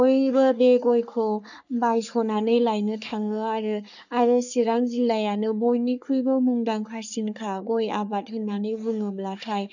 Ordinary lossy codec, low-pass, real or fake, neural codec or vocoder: none; 7.2 kHz; fake; codec, 44.1 kHz, 3.4 kbps, Pupu-Codec